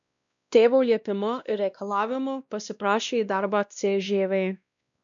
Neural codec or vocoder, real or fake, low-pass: codec, 16 kHz, 1 kbps, X-Codec, WavLM features, trained on Multilingual LibriSpeech; fake; 7.2 kHz